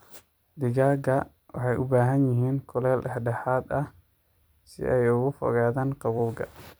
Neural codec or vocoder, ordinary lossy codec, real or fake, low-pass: vocoder, 44.1 kHz, 128 mel bands every 256 samples, BigVGAN v2; none; fake; none